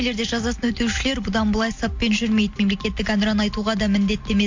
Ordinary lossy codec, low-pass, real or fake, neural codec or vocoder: MP3, 48 kbps; 7.2 kHz; real; none